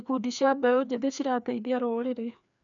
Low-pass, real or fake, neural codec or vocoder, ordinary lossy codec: 7.2 kHz; fake; codec, 16 kHz, 2 kbps, FreqCodec, larger model; none